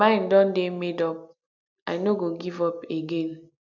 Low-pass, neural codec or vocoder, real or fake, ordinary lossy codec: 7.2 kHz; none; real; none